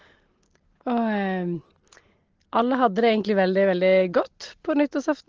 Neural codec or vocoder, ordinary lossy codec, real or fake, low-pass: none; Opus, 16 kbps; real; 7.2 kHz